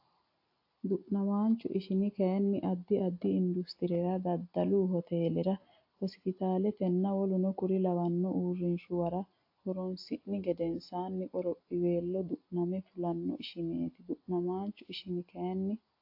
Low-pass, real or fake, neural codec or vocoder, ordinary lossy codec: 5.4 kHz; real; none; AAC, 32 kbps